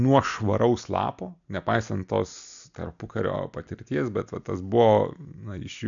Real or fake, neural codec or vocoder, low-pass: real; none; 7.2 kHz